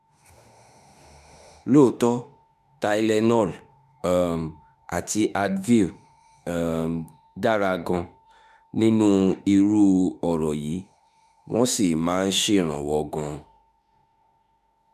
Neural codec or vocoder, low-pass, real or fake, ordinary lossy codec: autoencoder, 48 kHz, 32 numbers a frame, DAC-VAE, trained on Japanese speech; 14.4 kHz; fake; none